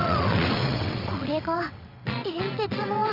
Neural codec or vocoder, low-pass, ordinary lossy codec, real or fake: vocoder, 22.05 kHz, 80 mel bands, WaveNeXt; 5.4 kHz; none; fake